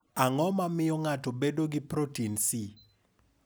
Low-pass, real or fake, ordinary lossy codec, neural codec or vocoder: none; real; none; none